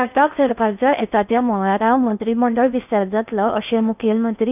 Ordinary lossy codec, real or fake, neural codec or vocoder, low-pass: none; fake; codec, 16 kHz in and 24 kHz out, 0.6 kbps, FocalCodec, streaming, 4096 codes; 3.6 kHz